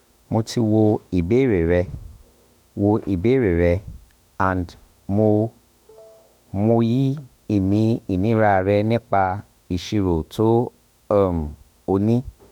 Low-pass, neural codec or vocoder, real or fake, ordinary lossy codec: 19.8 kHz; autoencoder, 48 kHz, 32 numbers a frame, DAC-VAE, trained on Japanese speech; fake; none